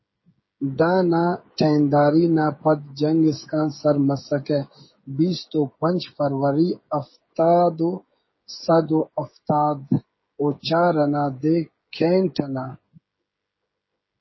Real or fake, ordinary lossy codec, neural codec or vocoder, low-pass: fake; MP3, 24 kbps; codec, 16 kHz, 16 kbps, FreqCodec, smaller model; 7.2 kHz